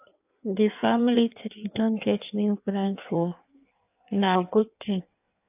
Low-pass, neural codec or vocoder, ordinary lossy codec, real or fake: 3.6 kHz; codec, 16 kHz in and 24 kHz out, 1.1 kbps, FireRedTTS-2 codec; AAC, 32 kbps; fake